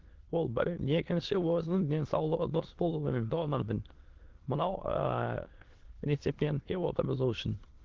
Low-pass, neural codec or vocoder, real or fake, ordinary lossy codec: 7.2 kHz; autoencoder, 22.05 kHz, a latent of 192 numbers a frame, VITS, trained on many speakers; fake; Opus, 16 kbps